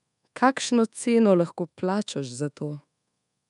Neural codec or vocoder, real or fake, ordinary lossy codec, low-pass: codec, 24 kHz, 1.2 kbps, DualCodec; fake; none; 10.8 kHz